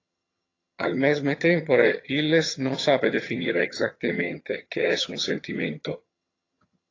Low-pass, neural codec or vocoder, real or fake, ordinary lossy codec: 7.2 kHz; vocoder, 22.05 kHz, 80 mel bands, HiFi-GAN; fake; AAC, 32 kbps